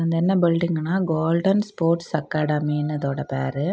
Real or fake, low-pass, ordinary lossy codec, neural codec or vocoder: real; none; none; none